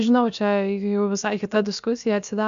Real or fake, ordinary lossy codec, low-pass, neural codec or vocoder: fake; AAC, 96 kbps; 7.2 kHz; codec, 16 kHz, about 1 kbps, DyCAST, with the encoder's durations